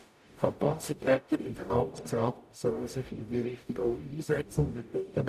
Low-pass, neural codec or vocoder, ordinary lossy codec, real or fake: 14.4 kHz; codec, 44.1 kHz, 0.9 kbps, DAC; none; fake